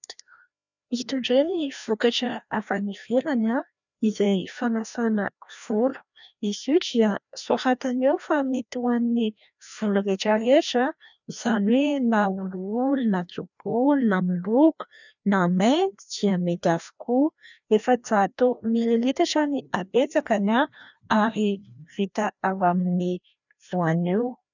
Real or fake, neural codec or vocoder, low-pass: fake; codec, 16 kHz, 1 kbps, FreqCodec, larger model; 7.2 kHz